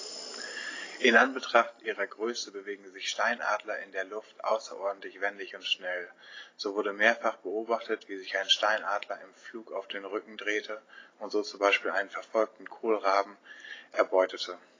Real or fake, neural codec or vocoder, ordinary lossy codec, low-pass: real; none; AAC, 32 kbps; 7.2 kHz